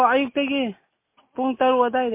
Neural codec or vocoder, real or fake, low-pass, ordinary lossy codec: none; real; 3.6 kHz; MP3, 24 kbps